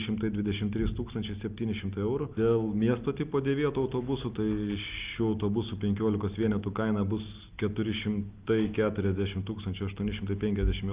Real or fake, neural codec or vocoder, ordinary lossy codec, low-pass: real; none; Opus, 24 kbps; 3.6 kHz